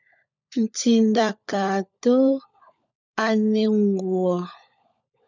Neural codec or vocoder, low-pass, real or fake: codec, 16 kHz, 16 kbps, FunCodec, trained on LibriTTS, 50 frames a second; 7.2 kHz; fake